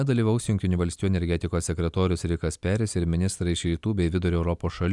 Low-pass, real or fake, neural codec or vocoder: 10.8 kHz; real; none